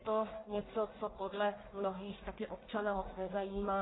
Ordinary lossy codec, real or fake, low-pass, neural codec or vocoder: AAC, 16 kbps; fake; 7.2 kHz; codec, 44.1 kHz, 1.7 kbps, Pupu-Codec